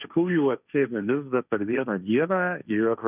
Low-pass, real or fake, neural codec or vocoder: 3.6 kHz; fake; codec, 16 kHz, 1.1 kbps, Voila-Tokenizer